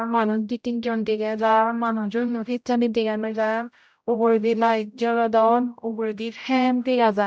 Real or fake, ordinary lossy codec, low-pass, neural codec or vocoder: fake; none; none; codec, 16 kHz, 0.5 kbps, X-Codec, HuBERT features, trained on general audio